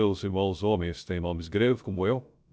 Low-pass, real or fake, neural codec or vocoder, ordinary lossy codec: none; fake; codec, 16 kHz, 0.3 kbps, FocalCodec; none